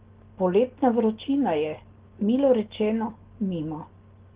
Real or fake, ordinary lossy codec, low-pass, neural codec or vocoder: real; Opus, 16 kbps; 3.6 kHz; none